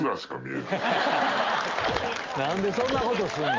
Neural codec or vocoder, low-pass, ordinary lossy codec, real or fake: none; 7.2 kHz; Opus, 32 kbps; real